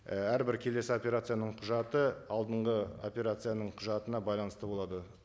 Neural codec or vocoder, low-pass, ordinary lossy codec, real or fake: none; none; none; real